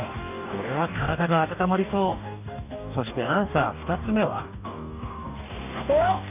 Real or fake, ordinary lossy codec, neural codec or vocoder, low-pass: fake; none; codec, 44.1 kHz, 2.6 kbps, DAC; 3.6 kHz